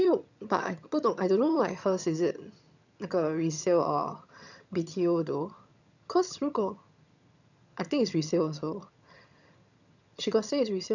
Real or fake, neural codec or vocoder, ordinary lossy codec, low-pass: fake; vocoder, 22.05 kHz, 80 mel bands, HiFi-GAN; none; 7.2 kHz